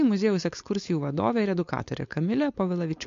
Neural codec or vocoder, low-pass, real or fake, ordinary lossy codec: codec, 16 kHz, 4.8 kbps, FACodec; 7.2 kHz; fake; MP3, 48 kbps